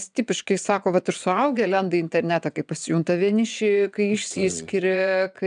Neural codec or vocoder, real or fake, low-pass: vocoder, 22.05 kHz, 80 mel bands, WaveNeXt; fake; 9.9 kHz